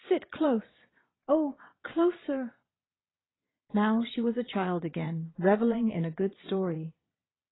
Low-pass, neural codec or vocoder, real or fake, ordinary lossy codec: 7.2 kHz; vocoder, 22.05 kHz, 80 mel bands, Vocos; fake; AAC, 16 kbps